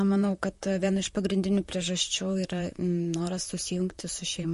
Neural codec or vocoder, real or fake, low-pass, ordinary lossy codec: vocoder, 44.1 kHz, 128 mel bands, Pupu-Vocoder; fake; 14.4 kHz; MP3, 48 kbps